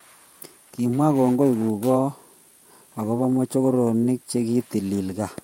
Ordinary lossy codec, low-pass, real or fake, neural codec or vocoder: MP3, 64 kbps; 19.8 kHz; fake; vocoder, 48 kHz, 128 mel bands, Vocos